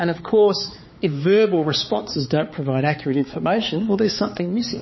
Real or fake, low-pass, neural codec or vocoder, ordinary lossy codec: fake; 7.2 kHz; codec, 16 kHz, 4 kbps, X-Codec, HuBERT features, trained on balanced general audio; MP3, 24 kbps